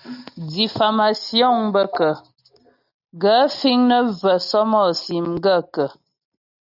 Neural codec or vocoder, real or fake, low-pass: none; real; 5.4 kHz